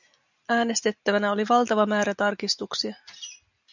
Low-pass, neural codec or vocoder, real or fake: 7.2 kHz; none; real